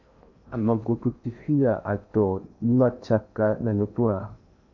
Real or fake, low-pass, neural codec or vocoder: fake; 7.2 kHz; codec, 16 kHz in and 24 kHz out, 0.8 kbps, FocalCodec, streaming, 65536 codes